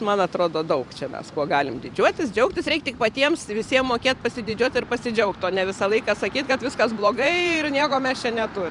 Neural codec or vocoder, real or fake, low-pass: vocoder, 44.1 kHz, 128 mel bands every 512 samples, BigVGAN v2; fake; 10.8 kHz